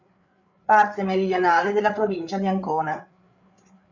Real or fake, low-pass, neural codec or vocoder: fake; 7.2 kHz; codec, 16 kHz, 8 kbps, FreqCodec, larger model